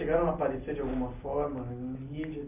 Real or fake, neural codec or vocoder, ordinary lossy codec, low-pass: real; none; none; 3.6 kHz